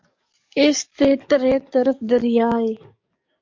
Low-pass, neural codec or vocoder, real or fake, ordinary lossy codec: 7.2 kHz; codec, 44.1 kHz, 7.8 kbps, DAC; fake; MP3, 48 kbps